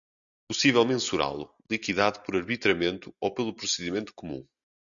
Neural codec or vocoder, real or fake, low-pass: none; real; 7.2 kHz